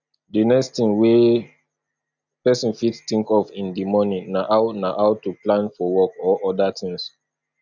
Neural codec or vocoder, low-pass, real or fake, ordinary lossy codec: none; 7.2 kHz; real; none